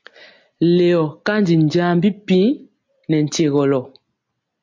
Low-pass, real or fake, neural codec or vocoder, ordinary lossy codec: 7.2 kHz; real; none; MP3, 48 kbps